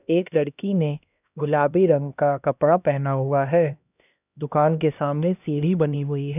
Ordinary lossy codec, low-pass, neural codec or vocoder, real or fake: none; 3.6 kHz; codec, 16 kHz, 1 kbps, X-Codec, HuBERT features, trained on LibriSpeech; fake